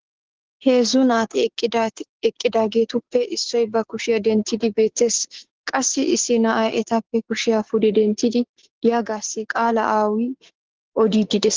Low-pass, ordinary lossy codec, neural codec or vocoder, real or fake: 7.2 kHz; Opus, 16 kbps; codec, 16 kHz, 6 kbps, DAC; fake